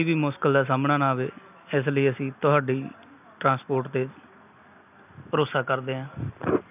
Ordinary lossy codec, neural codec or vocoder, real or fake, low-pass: none; none; real; 3.6 kHz